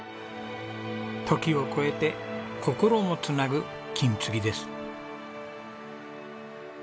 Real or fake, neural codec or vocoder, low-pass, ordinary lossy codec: real; none; none; none